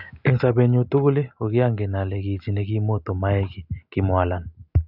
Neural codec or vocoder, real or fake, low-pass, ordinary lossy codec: none; real; 5.4 kHz; none